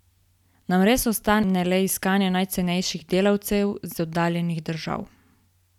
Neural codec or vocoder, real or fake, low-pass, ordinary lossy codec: none; real; 19.8 kHz; none